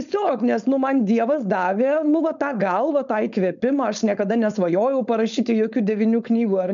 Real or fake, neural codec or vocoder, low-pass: fake; codec, 16 kHz, 4.8 kbps, FACodec; 7.2 kHz